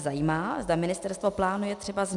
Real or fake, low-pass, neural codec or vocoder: real; 10.8 kHz; none